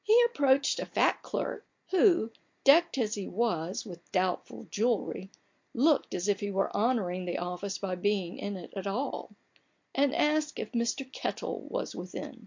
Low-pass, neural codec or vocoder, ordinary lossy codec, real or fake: 7.2 kHz; none; MP3, 48 kbps; real